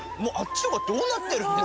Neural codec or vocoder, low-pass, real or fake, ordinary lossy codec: none; none; real; none